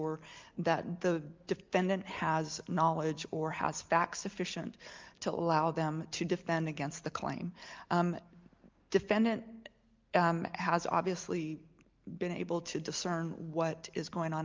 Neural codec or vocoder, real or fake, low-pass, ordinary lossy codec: none; real; 7.2 kHz; Opus, 24 kbps